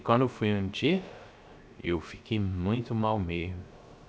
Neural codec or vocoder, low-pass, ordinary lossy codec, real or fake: codec, 16 kHz, 0.3 kbps, FocalCodec; none; none; fake